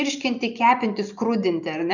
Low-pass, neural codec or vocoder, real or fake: 7.2 kHz; none; real